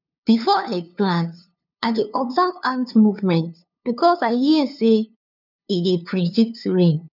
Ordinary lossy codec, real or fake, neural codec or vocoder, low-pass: none; fake; codec, 16 kHz, 2 kbps, FunCodec, trained on LibriTTS, 25 frames a second; 5.4 kHz